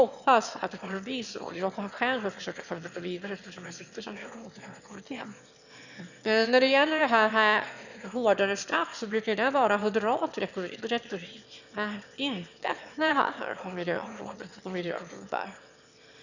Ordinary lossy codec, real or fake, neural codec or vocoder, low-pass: none; fake; autoencoder, 22.05 kHz, a latent of 192 numbers a frame, VITS, trained on one speaker; 7.2 kHz